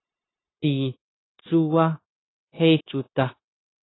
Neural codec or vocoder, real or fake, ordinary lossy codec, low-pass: codec, 16 kHz, 0.9 kbps, LongCat-Audio-Codec; fake; AAC, 16 kbps; 7.2 kHz